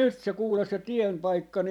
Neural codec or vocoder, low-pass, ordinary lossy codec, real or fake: vocoder, 44.1 kHz, 128 mel bands every 512 samples, BigVGAN v2; 19.8 kHz; none; fake